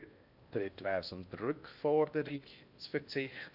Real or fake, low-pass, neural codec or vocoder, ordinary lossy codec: fake; 5.4 kHz; codec, 16 kHz in and 24 kHz out, 0.6 kbps, FocalCodec, streaming, 4096 codes; none